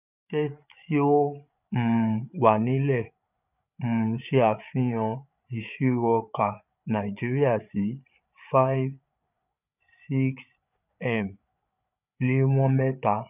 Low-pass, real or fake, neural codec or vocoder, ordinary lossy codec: 3.6 kHz; fake; codec, 16 kHz, 8 kbps, FreqCodec, larger model; none